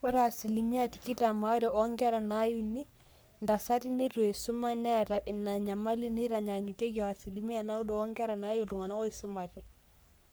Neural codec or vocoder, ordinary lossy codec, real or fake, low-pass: codec, 44.1 kHz, 3.4 kbps, Pupu-Codec; none; fake; none